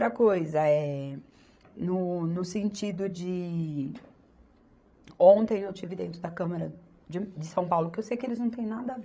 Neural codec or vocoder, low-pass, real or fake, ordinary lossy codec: codec, 16 kHz, 16 kbps, FreqCodec, larger model; none; fake; none